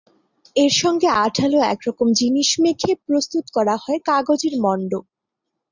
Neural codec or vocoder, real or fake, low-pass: none; real; 7.2 kHz